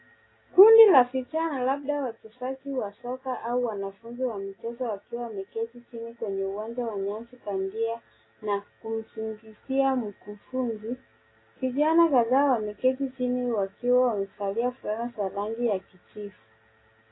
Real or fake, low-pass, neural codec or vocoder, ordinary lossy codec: real; 7.2 kHz; none; AAC, 16 kbps